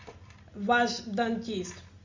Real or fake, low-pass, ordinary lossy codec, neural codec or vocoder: real; 7.2 kHz; MP3, 48 kbps; none